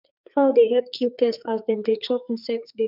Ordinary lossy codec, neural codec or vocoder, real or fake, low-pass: none; codec, 44.1 kHz, 2.6 kbps, SNAC; fake; 5.4 kHz